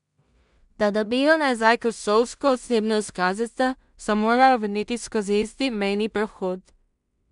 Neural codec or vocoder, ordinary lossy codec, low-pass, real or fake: codec, 16 kHz in and 24 kHz out, 0.4 kbps, LongCat-Audio-Codec, two codebook decoder; none; 10.8 kHz; fake